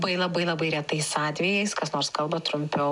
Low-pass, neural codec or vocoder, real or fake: 10.8 kHz; none; real